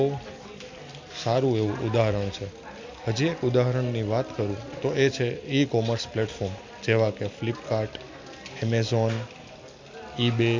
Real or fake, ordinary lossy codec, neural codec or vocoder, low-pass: real; MP3, 48 kbps; none; 7.2 kHz